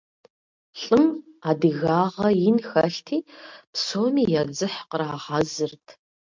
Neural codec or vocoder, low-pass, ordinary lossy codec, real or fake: none; 7.2 kHz; MP3, 64 kbps; real